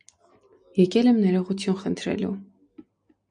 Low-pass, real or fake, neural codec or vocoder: 9.9 kHz; real; none